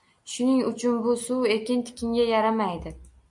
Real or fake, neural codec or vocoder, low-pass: real; none; 10.8 kHz